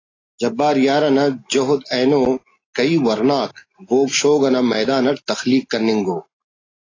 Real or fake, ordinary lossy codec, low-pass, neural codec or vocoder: real; AAC, 32 kbps; 7.2 kHz; none